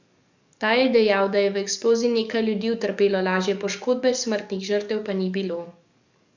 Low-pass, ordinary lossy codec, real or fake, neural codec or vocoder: 7.2 kHz; none; fake; codec, 44.1 kHz, 7.8 kbps, DAC